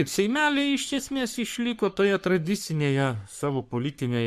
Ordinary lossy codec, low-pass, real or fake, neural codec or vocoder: MP3, 96 kbps; 14.4 kHz; fake; codec, 44.1 kHz, 3.4 kbps, Pupu-Codec